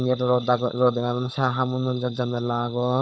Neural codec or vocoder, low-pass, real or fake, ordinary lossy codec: codec, 16 kHz, 16 kbps, FunCodec, trained on LibriTTS, 50 frames a second; none; fake; none